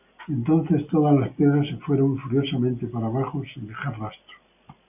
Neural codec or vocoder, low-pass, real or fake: none; 3.6 kHz; real